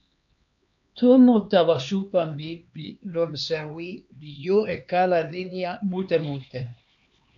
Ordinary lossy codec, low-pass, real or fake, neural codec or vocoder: AAC, 64 kbps; 7.2 kHz; fake; codec, 16 kHz, 2 kbps, X-Codec, HuBERT features, trained on LibriSpeech